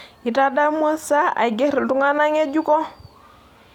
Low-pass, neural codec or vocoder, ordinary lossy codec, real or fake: 19.8 kHz; none; none; real